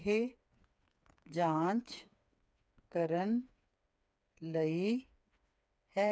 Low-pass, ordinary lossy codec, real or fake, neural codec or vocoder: none; none; fake; codec, 16 kHz, 4 kbps, FreqCodec, smaller model